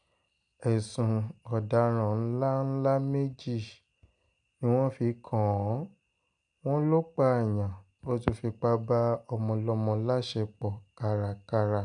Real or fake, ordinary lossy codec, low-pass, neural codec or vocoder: real; none; 9.9 kHz; none